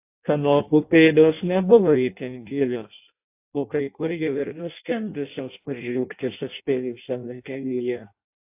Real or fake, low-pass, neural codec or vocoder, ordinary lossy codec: fake; 3.6 kHz; codec, 16 kHz in and 24 kHz out, 0.6 kbps, FireRedTTS-2 codec; AAC, 32 kbps